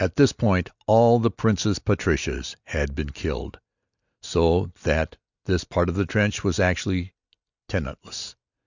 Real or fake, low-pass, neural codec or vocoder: real; 7.2 kHz; none